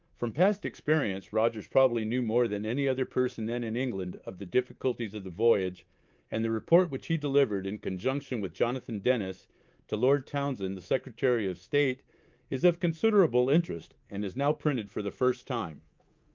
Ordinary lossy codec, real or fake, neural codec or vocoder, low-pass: Opus, 24 kbps; fake; codec, 24 kHz, 3.1 kbps, DualCodec; 7.2 kHz